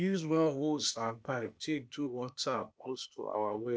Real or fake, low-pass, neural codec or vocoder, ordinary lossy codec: fake; none; codec, 16 kHz, 0.8 kbps, ZipCodec; none